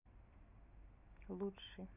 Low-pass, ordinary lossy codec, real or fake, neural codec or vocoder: 3.6 kHz; none; real; none